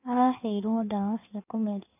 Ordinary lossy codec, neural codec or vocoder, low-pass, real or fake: none; codec, 16 kHz in and 24 kHz out, 1.1 kbps, FireRedTTS-2 codec; 3.6 kHz; fake